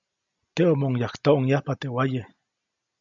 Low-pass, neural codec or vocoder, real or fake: 7.2 kHz; none; real